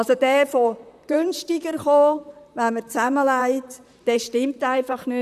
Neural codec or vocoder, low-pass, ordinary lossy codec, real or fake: vocoder, 44.1 kHz, 128 mel bands, Pupu-Vocoder; 14.4 kHz; none; fake